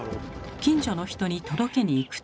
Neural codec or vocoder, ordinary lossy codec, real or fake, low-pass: none; none; real; none